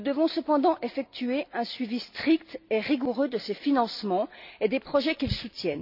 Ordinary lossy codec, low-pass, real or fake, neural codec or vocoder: none; 5.4 kHz; real; none